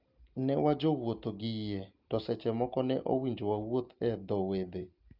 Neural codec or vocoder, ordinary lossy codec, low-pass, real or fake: none; Opus, 24 kbps; 5.4 kHz; real